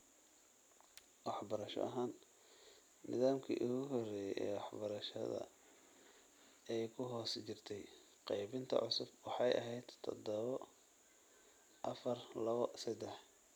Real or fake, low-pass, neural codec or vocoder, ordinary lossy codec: real; none; none; none